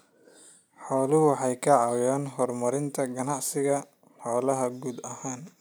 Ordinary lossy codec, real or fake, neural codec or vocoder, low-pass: none; real; none; none